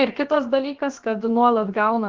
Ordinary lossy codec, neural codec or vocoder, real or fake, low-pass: Opus, 16 kbps; codec, 16 kHz, about 1 kbps, DyCAST, with the encoder's durations; fake; 7.2 kHz